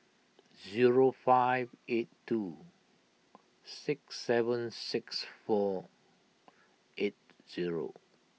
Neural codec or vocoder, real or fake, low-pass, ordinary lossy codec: none; real; none; none